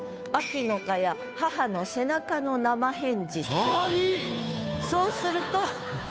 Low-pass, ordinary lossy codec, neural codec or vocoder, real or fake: none; none; codec, 16 kHz, 2 kbps, FunCodec, trained on Chinese and English, 25 frames a second; fake